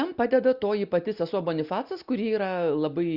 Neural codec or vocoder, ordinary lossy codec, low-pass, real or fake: none; Opus, 64 kbps; 5.4 kHz; real